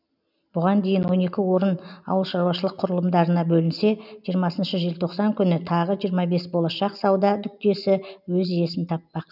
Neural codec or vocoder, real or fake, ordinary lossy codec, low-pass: none; real; none; 5.4 kHz